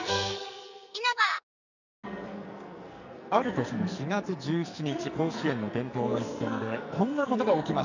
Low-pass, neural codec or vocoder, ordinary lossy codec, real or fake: 7.2 kHz; codec, 44.1 kHz, 2.6 kbps, SNAC; none; fake